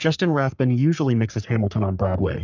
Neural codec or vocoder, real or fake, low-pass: codec, 44.1 kHz, 3.4 kbps, Pupu-Codec; fake; 7.2 kHz